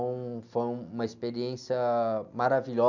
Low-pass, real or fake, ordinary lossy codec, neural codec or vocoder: 7.2 kHz; real; none; none